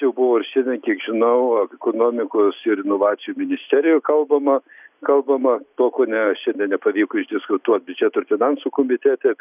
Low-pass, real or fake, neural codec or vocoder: 3.6 kHz; real; none